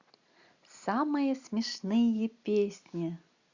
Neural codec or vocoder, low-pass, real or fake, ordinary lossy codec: none; 7.2 kHz; real; Opus, 64 kbps